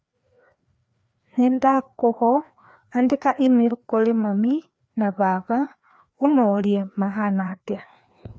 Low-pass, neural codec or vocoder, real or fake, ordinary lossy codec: none; codec, 16 kHz, 2 kbps, FreqCodec, larger model; fake; none